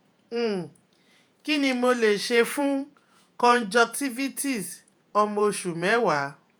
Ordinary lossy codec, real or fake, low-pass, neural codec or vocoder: none; fake; none; vocoder, 48 kHz, 128 mel bands, Vocos